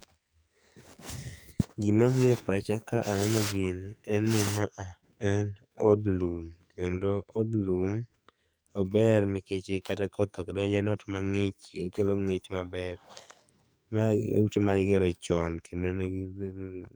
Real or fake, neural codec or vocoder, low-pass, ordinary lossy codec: fake; codec, 44.1 kHz, 2.6 kbps, SNAC; none; none